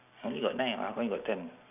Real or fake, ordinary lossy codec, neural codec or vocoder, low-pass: real; none; none; 3.6 kHz